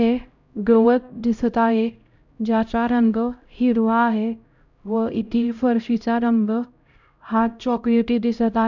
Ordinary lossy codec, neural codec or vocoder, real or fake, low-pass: none; codec, 16 kHz, 0.5 kbps, X-Codec, HuBERT features, trained on LibriSpeech; fake; 7.2 kHz